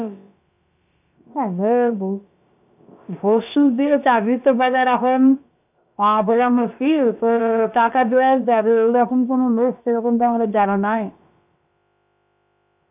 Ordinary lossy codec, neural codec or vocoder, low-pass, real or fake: none; codec, 16 kHz, about 1 kbps, DyCAST, with the encoder's durations; 3.6 kHz; fake